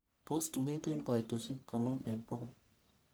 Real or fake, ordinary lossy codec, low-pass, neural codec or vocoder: fake; none; none; codec, 44.1 kHz, 1.7 kbps, Pupu-Codec